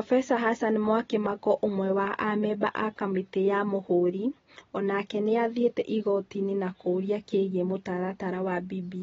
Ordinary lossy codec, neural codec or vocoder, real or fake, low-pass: AAC, 24 kbps; none; real; 7.2 kHz